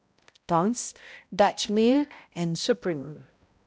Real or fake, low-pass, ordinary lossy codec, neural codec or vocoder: fake; none; none; codec, 16 kHz, 0.5 kbps, X-Codec, HuBERT features, trained on balanced general audio